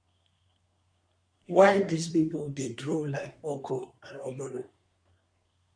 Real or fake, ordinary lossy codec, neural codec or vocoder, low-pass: fake; MP3, 96 kbps; codec, 24 kHz, 1 kbps, SNAC; 9.9 kHz